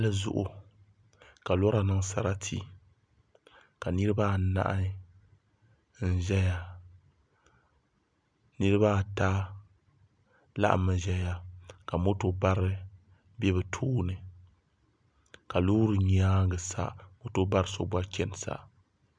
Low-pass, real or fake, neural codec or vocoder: 9.9 kHz; real; none